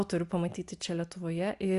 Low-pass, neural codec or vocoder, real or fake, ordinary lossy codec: 10.8 kHz; none; real; Opus, 64 kbps